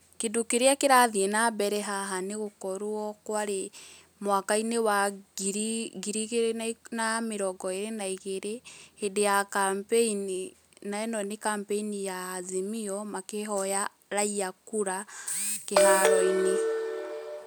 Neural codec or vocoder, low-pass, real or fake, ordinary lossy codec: none; none; real; none